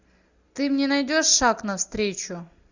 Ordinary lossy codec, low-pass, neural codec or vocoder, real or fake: Opus, 64 kbps; 7.2 kHz; none; real